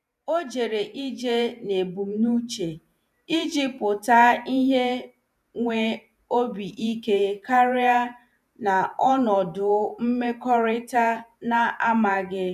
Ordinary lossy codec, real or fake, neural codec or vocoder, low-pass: none; fake; vocoder, 48 kHz, 128 mel bands, Vocos; 14.4 kHz